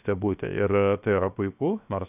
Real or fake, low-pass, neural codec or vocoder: fake; 3.6 kHz; codec, 16 kHz, 0.7 kbps, FocalCodec